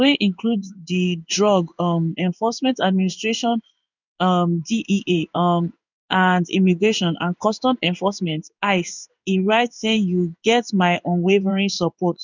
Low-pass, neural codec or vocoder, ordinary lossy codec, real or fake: 7.2 kHz; codec, 16 kHz in and 24 kHz out, 1 kbps, XY-Tokenizer; none; fake